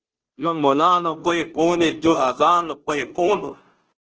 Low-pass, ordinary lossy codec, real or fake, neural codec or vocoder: 7.2 kHz; Opus, 16 kbps; fake; codec, 16 kHz, 0.5 kbps, FunCodec, trained on Chinese and English, 25 frames a second